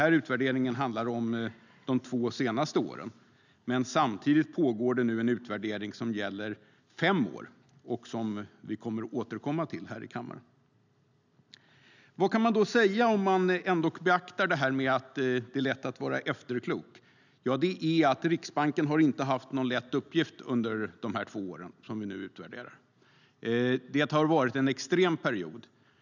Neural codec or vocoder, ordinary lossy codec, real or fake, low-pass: none; none; real; 7.2 kHz